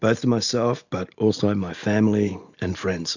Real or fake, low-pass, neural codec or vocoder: real; 7.2 kHz; none